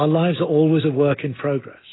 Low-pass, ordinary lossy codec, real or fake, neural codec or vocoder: 7.2 kHz; AAC, 16 kbps; real; none